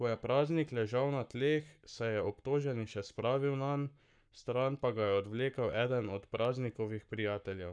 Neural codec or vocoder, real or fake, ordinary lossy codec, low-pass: codec, 44.1 kHz, 7.8 kbps, Pupu-Codec; fake; none; 9.9 kHz